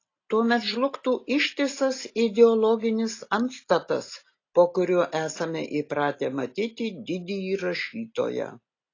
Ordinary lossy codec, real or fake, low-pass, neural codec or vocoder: AAC, 32 kbps; real; 7.2 kHz; none